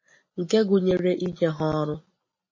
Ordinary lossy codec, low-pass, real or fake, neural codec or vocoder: MP3, 32 kbps; 7.2 kHz; real; none